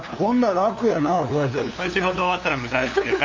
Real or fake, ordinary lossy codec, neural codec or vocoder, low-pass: fake; MP3, 48 kbps; codec, 16 kHz, 2 kbps, FunCodec, trained on LibriTTS, 25 frames a second; 7.2 kHz